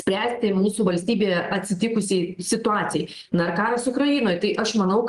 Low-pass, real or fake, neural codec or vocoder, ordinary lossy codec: 10.8 kHz; real; none; Opus, 24 kbps